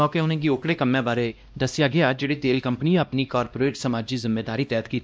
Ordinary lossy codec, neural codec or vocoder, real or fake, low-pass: none; codec, 16 kHz, 1 kbps, X-Codec, WavLM features, trained on Multilingual LibriSpeech; fake; none